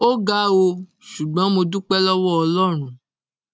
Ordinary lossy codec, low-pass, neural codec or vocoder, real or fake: none; none; none; real